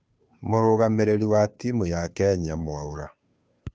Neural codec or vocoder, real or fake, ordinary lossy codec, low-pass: codec, 16 kHz, 2 kbps, FunCodec, trained on Chinese and English, 25 frames a second; fake; none; none